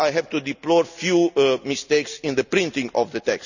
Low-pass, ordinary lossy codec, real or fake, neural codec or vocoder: 7.2 kHz; none; real; none